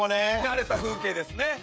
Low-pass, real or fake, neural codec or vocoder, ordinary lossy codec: none; fake; codec, 16 kHz, 16 kbps, FreqCodec, smaller model; none